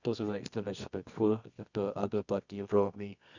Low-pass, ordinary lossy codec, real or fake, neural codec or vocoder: 7.2 kHz; Opus, 64 kbps; fake; codec, 24 kHz, 0.9 kbps, WavTokenizer, medium music audio release